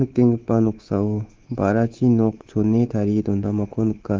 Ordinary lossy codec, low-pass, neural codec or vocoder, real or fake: Opus, 16 kbps; 7.2 kHz; codec, 24 kHz, 3.1 kbps, DualCodec; fake